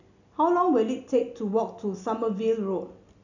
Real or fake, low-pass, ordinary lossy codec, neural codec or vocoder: real; 7.2 kHz; none; none